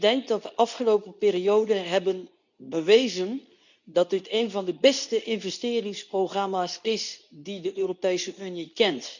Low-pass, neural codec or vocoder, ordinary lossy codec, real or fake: 7.2 kHz; codec, 24 kHz, 0.9 kbps, WavTokenizer, medium speech release version 2; none; fake